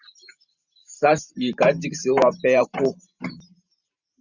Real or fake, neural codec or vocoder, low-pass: fake; vocoder, 44.1 kHz, 128 mel bands every 512 samples, BigVGAN v2; 7.2 kHz